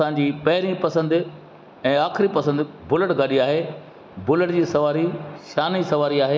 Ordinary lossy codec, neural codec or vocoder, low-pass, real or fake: none; none; none; real